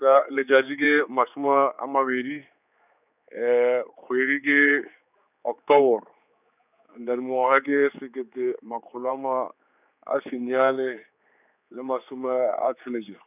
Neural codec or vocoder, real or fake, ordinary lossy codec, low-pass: codec, 16 kHz, 4 kbps, X-Codec, HuBERT features, trained on general audio; fake; none; 3.6 kHz